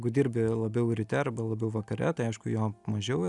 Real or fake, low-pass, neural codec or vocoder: real; 10.8 kHz; none